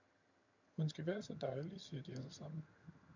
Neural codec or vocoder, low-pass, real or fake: vocoder, 22.05 kHz, 80 mel bands, HiFi-GAN; 7.2 kHz; fake